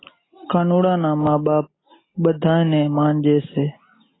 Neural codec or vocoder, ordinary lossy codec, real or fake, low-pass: none; AAC, 16 kbps; real; 7.2 kHz